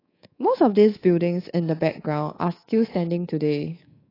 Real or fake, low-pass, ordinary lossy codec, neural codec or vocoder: fake; 5.4 kHz; AAC, 24 kbps; codec, 24 kHz, 3.1 kbps, DualCodec